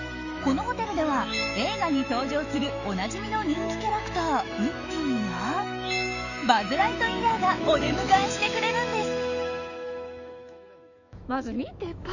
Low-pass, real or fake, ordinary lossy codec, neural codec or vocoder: 7.2 kHz; fake; none; autoencoder, 48 kHz, 128 numbers a frame, DAC-VAE, trained on Japanese speech